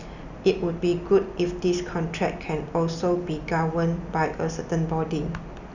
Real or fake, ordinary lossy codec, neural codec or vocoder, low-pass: real; none; none; 7.2 kHz